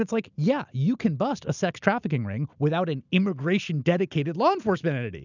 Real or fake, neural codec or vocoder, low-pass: real; none; 7.2 kHz